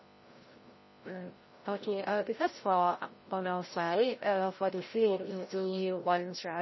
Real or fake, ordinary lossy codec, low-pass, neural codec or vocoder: fake; MP3, 24 kbps; 7.2 kHz; codec, 16 kHz, 0.5 kbps, FreqCodec, larger model